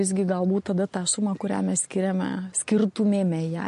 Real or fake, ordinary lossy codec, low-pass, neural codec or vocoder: real; MP3, 48 kbps; 10.8 kHz; none